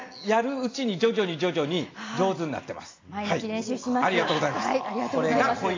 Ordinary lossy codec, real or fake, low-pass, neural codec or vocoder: AAC, 32 kbps; real; 7.2 kHz; none